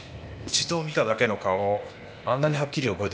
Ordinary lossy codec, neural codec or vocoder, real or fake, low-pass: none; codec, 16 kHz, 0.8 kbps, ZipCodec; fake; none